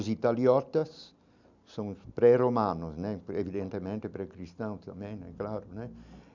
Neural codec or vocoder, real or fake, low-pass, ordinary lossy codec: none; real; 7.2 kHz; none